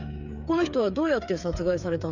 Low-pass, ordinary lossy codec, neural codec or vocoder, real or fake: 7.2 kHz; none; codec, 16 kHz, 8 kbps, FreqCodec, smaller model; fake